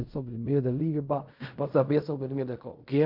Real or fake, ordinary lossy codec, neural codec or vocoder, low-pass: fake; MP3, 48 kbps; codec, 16 kHz in and 24 kHz out, 0.4 kbps, LongCat-Audio-Codec, fine tuned four codebook decoder; 5.4 kHz